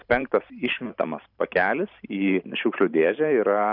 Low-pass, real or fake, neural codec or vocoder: 5.4 kHz; fake; vocoder, 44.1 kHz, 128 mel bands every 512 samples, BigVGAN v2